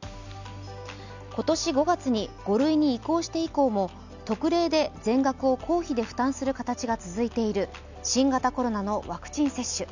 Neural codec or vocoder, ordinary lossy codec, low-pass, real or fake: none; none; 7.2 kHz; real